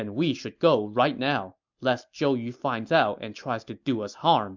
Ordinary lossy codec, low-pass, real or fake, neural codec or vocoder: MP3, 64 kbps; 7.2 kHz; real; none